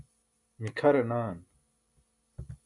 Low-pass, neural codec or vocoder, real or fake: 10.8 kHz; none; real